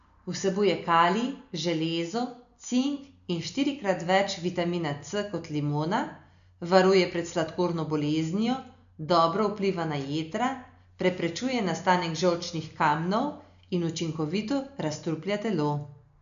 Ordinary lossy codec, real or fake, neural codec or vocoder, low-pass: none; real; none; 7.2 kHz